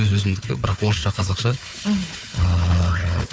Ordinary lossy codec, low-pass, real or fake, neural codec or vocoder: none; none; fake; codec, 16 kHz, 4 kbps, FunCodec, trained on Chinese and English, 50 frames a second